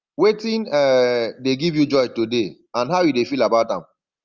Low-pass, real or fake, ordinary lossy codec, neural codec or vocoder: 7.2 kHz; real; Opus, 32 kbps; none